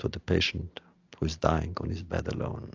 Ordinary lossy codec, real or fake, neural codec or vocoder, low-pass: AAC, 48 kbps; real; none; 7.2 kHz